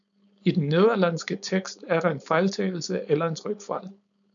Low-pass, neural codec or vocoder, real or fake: 7.2 kHz; codec, 16 kHz, 4.8 kbps, FACodec; fake